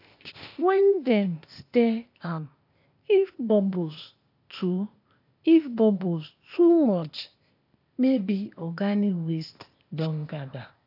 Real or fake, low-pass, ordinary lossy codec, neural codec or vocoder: fake; 5.4 kHz; AAC, 48 kbps; codec, 16 kHz, 0.8 kbps, ZipCodec